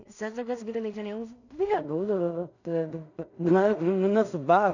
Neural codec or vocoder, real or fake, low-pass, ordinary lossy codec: codec, 16 kHz in and 24 kHz out, 0.4 kbps, LongCat-Audio-Codec, two codebook decoder; fake; 7.2 kHz; none